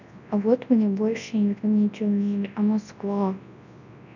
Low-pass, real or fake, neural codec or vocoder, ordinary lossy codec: 7.2 kHz; fake; codec, 24 kHz, 0.9 kbps, WavTokenizer, large speech release; none